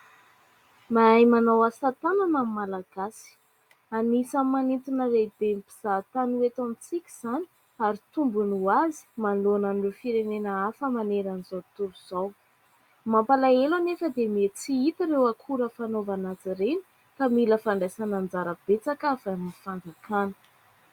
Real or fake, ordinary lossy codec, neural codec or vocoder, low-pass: real; Opus, 64 kbps; none; 19.8 kHz